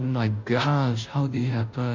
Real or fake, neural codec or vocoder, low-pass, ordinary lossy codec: fake; codec, 16 kHz, 0.5 kbps, FunCodec, trained on Chinese and English, 25 frames a second; 7.2 kHz; MP3, 48 kbps